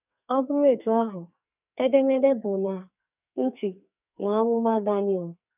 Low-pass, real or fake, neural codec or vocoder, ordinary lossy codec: 3.6 kHz; fake; codec, 44.1 kHz, 2.6 kbps, SNAC; none